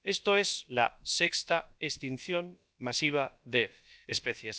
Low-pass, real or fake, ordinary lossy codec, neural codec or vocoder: none; fake; none; codec, 16 kHz, about 1 kbps, DyCAST, with the encoder's durations